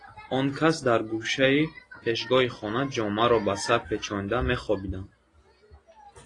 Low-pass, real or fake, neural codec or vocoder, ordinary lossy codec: 10.8 kHz; real; none; AAC, 32 kbps